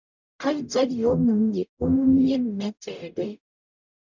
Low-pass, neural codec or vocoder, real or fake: 7.2 kHz; codec, 44.1 kHz, 0.9 kbps, DAC; fake